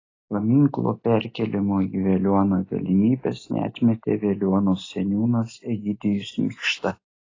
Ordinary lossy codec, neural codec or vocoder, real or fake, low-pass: AAC, 32 kbps; none; real; 7.2 kHz